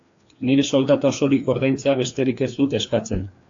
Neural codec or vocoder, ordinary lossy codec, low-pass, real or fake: codec, 16 kHz, 2 kbps, FreqCodec, larger model; AAC, 48 kbps; 7.2 kHz; fake